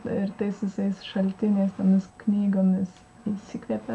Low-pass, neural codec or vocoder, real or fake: 10.8 kHz; none; real